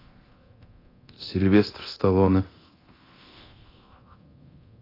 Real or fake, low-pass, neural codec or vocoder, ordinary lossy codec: fake; 5.4 kHz; codec, 24 kHz, 0.9 kbps, DualCodec; AAC, 24 kbps